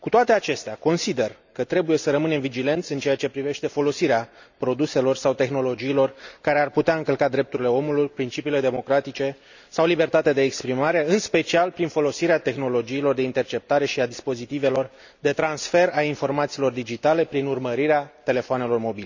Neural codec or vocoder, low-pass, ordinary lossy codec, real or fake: none; 7.2 kHz; none; real